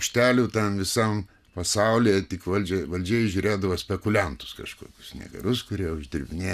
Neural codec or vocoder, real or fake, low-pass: none; real; 14.4 kHz